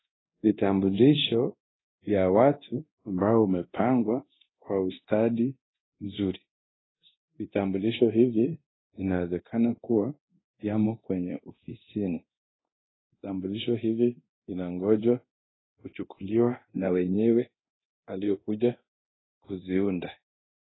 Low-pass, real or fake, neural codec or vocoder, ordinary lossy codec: 7.2 kHz; fake; codec, 24 kHz, 0.9 kbps, DualCodec; AAC, 16 kbps